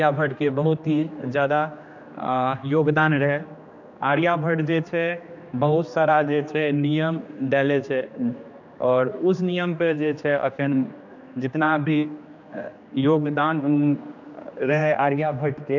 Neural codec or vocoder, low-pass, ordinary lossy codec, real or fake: codec, 16 kHz, 2 kbps, X-Codec, HuBERT features, trained on general audio; 7.2 kHz; none; fake